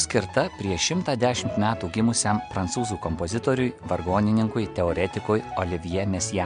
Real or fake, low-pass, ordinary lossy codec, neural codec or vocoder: real; 9.9 kHz; MP3, 64 kbps; none